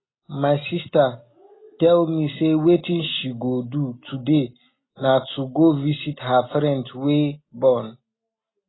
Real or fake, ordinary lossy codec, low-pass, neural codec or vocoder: real; AAC, 16 kbps; 7.2 kHz; none